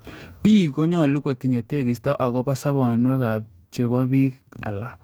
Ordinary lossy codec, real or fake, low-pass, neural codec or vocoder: none; fake; none; codec, 44.1 kHz, 2.6 kbps, DAC